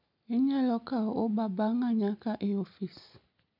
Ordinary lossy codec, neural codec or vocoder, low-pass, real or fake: none; none; 5.4 kHz; real